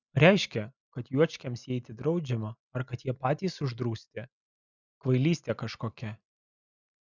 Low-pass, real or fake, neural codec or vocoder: 7.2 kHz; real; none